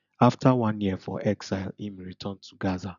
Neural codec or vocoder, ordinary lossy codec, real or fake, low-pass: none; none; real; 7.2 kHz